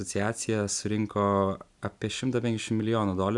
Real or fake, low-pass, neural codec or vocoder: real; 10.8 kHz; none